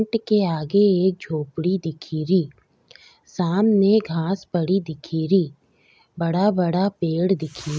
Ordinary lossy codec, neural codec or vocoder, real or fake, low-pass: none; none; real; none